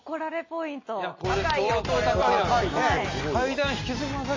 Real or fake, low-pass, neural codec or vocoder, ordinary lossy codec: real; 7.2 kHz; none; MP3, 32 kbps